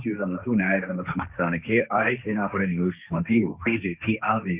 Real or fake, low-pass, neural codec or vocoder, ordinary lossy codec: fake; 3.6 kHz; codec, 16 kHz, 1.1 kbps, Voila-Tokenizer; Opus, 64 kbps